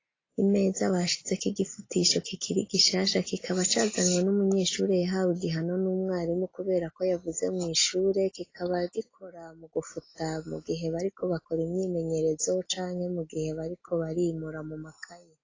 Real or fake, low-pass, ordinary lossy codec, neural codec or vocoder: real; 7.2 kHz; AAC, 32 kbps; none